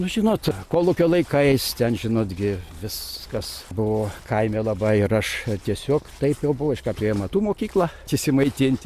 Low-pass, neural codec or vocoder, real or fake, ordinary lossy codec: 14.4 kHz; none; real; Opus, 64 kbps